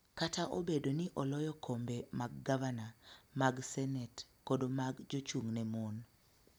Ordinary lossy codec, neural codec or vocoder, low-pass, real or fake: none; none; none; real